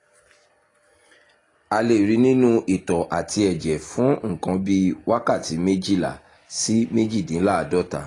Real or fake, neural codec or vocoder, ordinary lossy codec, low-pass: real; none; AAC, 32 kbps; 10.8 kHz